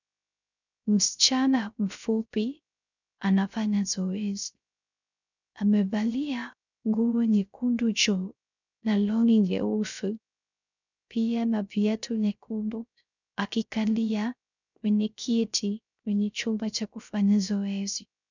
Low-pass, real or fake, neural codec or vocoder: 7.2 kHz; fake; codec, 16 kHz, 0.3 kbps, FocalCodec